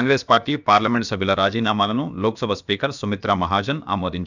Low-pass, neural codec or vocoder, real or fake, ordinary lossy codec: 7.2 kHz; codec, 16 kHz, about 1 kbps, DyCAST, with the encoder's durations; fake; none